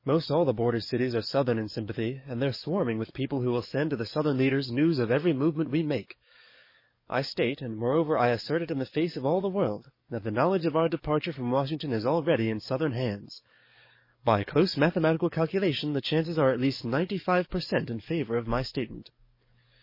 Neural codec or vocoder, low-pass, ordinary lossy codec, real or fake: codec, 44.1 kHz, 7.8 kbps, DAC; 5.4 kHz; MP3, 24 kbps; fake